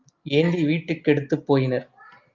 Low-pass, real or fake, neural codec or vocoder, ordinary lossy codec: 7.2 kHz; real; none; Opus, 32 kbps